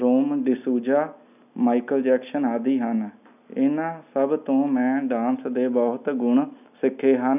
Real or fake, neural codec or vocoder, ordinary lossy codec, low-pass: real; none; none; 3.6 kHz